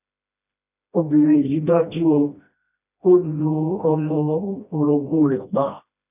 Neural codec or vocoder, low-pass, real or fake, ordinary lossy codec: codec, 16 kHz, 1 kbps, FreqCodec, smaller model; 3.6 kHz; fake; none